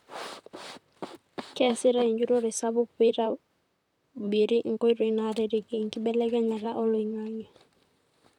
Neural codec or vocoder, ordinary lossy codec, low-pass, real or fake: vocoder, 44.1 kHz, 128 mel bands, Pupu-Vocoder; none; 19.8 kHz; fake